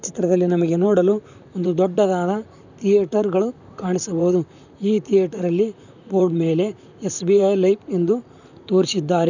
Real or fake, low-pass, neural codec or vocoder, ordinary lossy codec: fake; 7.2 kHz; vocoder, 44.1 kHz, 128 mel bands, Pupu-Vocoder; none